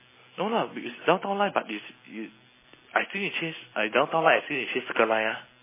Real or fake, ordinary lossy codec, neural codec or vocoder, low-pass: real; MP3, 16 kbps; none; 3.6 kHz